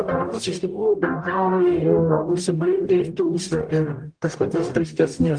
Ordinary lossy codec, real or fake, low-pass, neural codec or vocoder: Opus, 32 kbps; fake; 9.9 kHz; codec, 44.1 kHz, 0.9 kbps, DAC